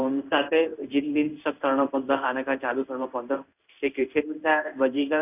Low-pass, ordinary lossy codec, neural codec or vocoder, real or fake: 3.6 kHz; none; codec, 16 kHz in and 24 kHz out, 1 kbps, XY-Tokenizer; fake